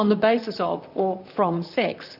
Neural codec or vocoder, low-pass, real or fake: vocoder, 44.1 kHz, 128 mel bands, Pupu-Vocoder; 5.4 kHz; fake